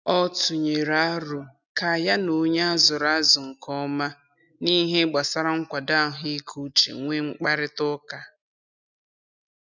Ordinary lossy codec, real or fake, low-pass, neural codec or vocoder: none; real; 7.2 kHz; none